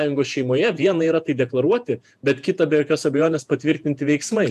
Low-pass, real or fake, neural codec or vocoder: 14.4 kHz; fake; vocoder, 48 kHz, 128 mel bands, Vocos